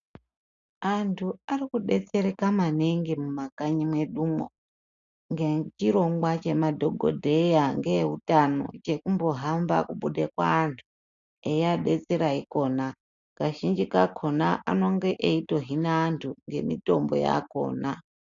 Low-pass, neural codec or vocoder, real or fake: 7.2 kHz; none; real